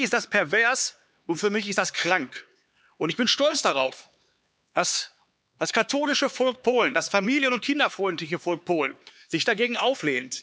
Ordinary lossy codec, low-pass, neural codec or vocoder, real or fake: none; none; codec, 16 kHz, 4 kbps, X-Codec, HuBERT features, trained on LibriSpeech; fake